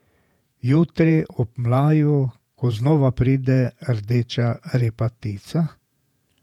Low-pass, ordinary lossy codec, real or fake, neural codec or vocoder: 19.8 kHz; none; fake; vocoder, 48 kHz, 128 mel bands, Vocos